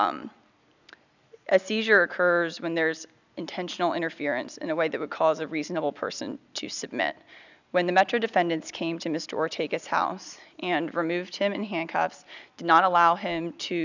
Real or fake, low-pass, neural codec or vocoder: real; 7.2 kHz; none